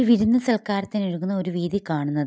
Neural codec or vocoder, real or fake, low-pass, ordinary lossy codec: none; real; none; none